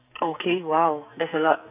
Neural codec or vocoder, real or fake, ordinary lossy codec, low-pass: codec, 44.1 kHz, 2.6 kbps, SNAC; fake; none; 3.6 kHz